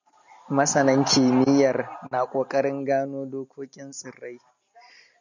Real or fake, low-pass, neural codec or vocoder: real; 7.2 kHz; none